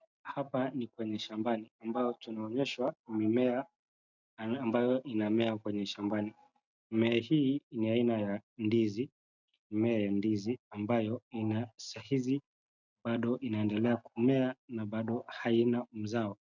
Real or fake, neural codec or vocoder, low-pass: real; none; 7.2 kHz